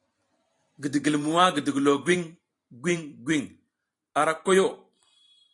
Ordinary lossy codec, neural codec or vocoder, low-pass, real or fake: AAC, 48 kbps; vocoder, 44.1 kHz, 128 mel bands every 512 samples, BigVGAN v2; 10.8 kHz; fake